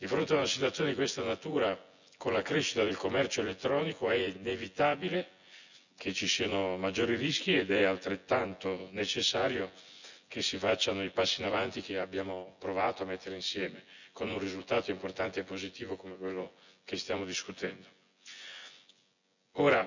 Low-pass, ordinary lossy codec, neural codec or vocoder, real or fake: 7.2 kHz; none; vocoder, 24 kHz, 100 mel bands, Vocos; fake